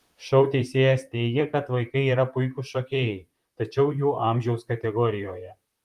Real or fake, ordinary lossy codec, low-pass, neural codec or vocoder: fake; Opus, 32 kbps; 14.4 kHz; vocoder, 44.1 kHz, 128 mel bands, Pupu-Vocoder